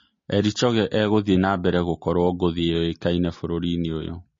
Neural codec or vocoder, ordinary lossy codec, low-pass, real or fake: none; MP3, 32 kbps; 7.2 kHz; real